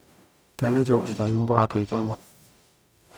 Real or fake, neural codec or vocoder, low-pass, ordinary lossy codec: fake; codec, 44.1 kHz, 0.9 kbps, DAC; none; none